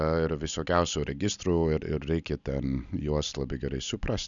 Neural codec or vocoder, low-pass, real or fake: none; 7.2 kHz; real